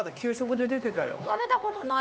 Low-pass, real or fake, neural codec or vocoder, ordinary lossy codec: none; fake; codec, 16 kHz, 2 kbps, X-Codec, HuBERT features, trained on LibriSpeech; none